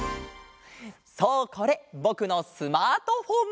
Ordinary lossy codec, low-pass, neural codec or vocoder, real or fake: none; none; none; real